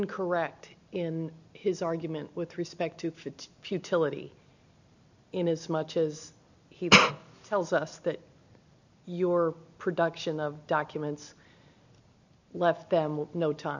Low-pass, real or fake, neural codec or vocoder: 7.2 kHz; real; none